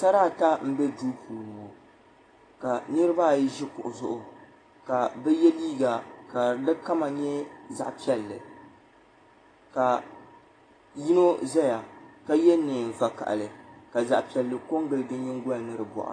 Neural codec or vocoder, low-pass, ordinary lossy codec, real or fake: none; 9.9 kHz; AAC, 32 kbps; real